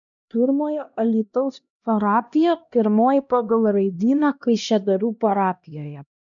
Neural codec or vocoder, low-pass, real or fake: codec, 16 kHz, 1 kbps, X-Codec, HuBERT features, trained on LibriSpeech; 7.2 kHz; fake